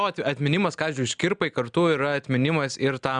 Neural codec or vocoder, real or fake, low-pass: none; real; 9.9 kHz